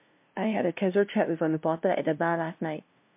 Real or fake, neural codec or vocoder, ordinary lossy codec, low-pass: fake; codec, 16 kHz, 0.5 kbps, FunCodec, trained on LibriTTS, 25 frames a second; MP3, 32 kbps; 3.6 kHz